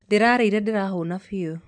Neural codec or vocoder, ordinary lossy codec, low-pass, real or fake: vocoder, 44.1 kHz, 128 mel bands every 512 samples, BigVGAN v2; none; 9.9 kHz; fake